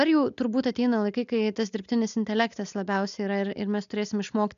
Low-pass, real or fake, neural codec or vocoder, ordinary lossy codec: 7.2 kHz; real; none; MP3, 96 kbps